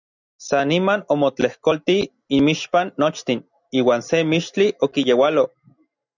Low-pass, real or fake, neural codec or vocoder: 7.2 kHz; real; none